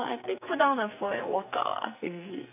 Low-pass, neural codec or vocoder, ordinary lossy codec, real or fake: 3.6 kHz; codec, 44.1 kHz, 2.6 kbps, SNAC; none; fake